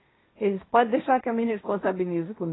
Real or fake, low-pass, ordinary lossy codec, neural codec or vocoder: fake; 7.2 kHz; AAC, 16 kbps; codec, 16 kHz, 1.1 kbps, Voila-Tokenizer